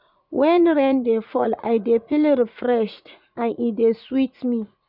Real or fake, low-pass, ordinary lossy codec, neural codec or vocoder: fake; 5.4 kHz; none; vocoder, 44.1 kHz, 80 mel bands, Vocos